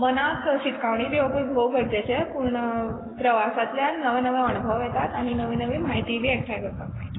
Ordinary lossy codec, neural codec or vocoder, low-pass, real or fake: AAC, 16 kbps; codec, 44.1 kHz, 7.8 kbps, Pupu-Codec; 7.2 kHz; fake